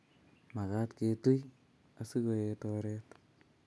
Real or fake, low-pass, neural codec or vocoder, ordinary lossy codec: real; none; none; none